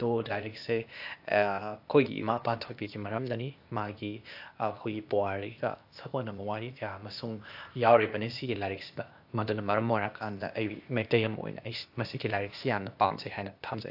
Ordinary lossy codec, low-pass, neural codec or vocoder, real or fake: AAC, 48 kbps; 5.4 kHz; codec, 16 kHz, 0.8 kbps, ZipCodec; fake